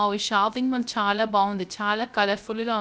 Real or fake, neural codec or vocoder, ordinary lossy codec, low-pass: fake; codec, 16 kHz, 0.3 kbps, FocalCodec; none; none